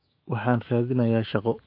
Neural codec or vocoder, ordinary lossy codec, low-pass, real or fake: autoencoder, 48 kHz, 128 numbers a frame, DAC-VAE, trained on Japanese speech; MP3, 32 kbps; 5.4 kHz; fake